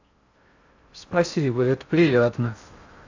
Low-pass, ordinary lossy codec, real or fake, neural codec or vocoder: 7.2 kHz; AAC, 48 kbps; fake; codec, 16 kHz in and 24 kHz out, 0.6 kbps, FocalCodec, streaming, 4096 codes